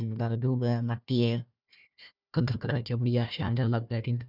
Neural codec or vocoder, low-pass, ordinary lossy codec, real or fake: codec, 16 kHz, 1 kbps, FunCodec, trained on Chinese and English, 50 frames a second; 5.4 kHz; none; fake